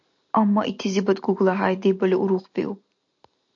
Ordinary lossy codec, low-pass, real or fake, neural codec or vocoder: AAC, 64 kbps; 7.2 kHz; real; none